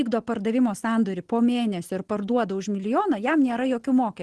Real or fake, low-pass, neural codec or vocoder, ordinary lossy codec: real; 10.8 kHz; none; Opus, 16 kbps